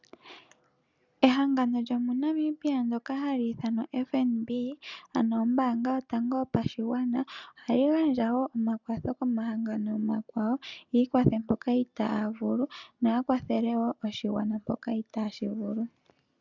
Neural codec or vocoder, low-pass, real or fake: none; 7.2 kHz; real